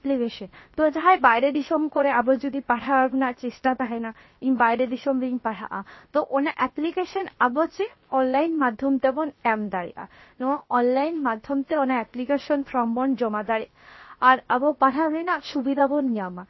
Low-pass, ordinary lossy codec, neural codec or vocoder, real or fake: 7.2 kHz; MP3, 24 kbps; codec, 16 kHz, about 1 kbps, DyCAST, with the encoder's durations; fake